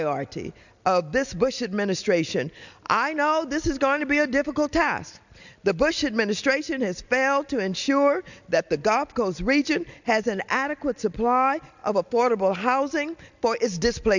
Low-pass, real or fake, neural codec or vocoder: 7.2 kHz; real; none